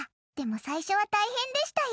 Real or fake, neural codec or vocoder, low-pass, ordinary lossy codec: real; none; none; none